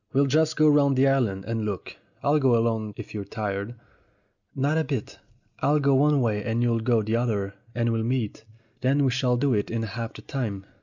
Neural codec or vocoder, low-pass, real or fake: none; 7.2 kHz; real